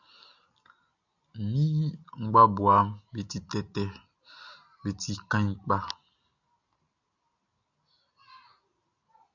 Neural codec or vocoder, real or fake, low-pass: none; real; 7.2 kHz